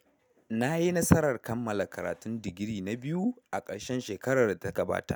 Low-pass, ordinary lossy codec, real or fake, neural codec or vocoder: none; none; real; none